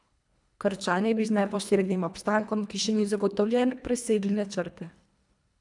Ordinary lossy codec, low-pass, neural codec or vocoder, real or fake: none; 10.8 kHz; codec, 24 kHz, 1.5 kbps, HILCodec; fake